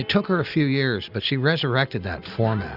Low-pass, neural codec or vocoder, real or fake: 5.4 kHz; none; real